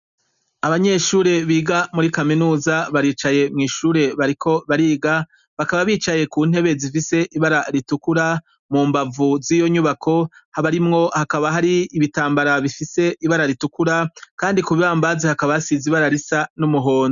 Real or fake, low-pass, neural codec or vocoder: real; 7.2 kHz; none